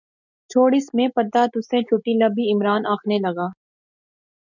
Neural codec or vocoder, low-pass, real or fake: none; 7.2 kHz; real